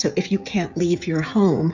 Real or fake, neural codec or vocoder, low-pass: fake; codec, 16 kHz, 16 kbps, FreqCodec, smaller model; 7.2 kHz